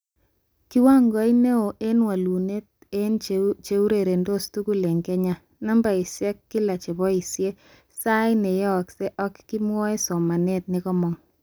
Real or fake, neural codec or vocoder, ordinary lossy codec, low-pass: real; none; none; none